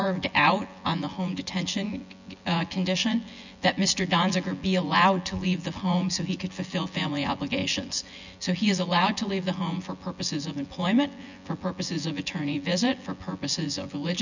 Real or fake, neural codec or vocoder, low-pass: fake; vocoder, 24 kHz, 100 mel bands, Vocos; 7.2 kHz